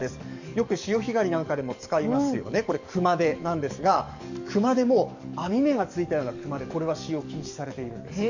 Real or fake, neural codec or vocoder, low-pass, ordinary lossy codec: fake; codec, 44.1 kHz, 7.8 kbps, DAC; 7.2 kHz; none